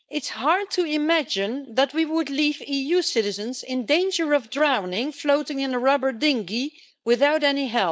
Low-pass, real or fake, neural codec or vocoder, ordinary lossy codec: none; fake; codec, 16 kHz, 4.8 kbps, FACodec; none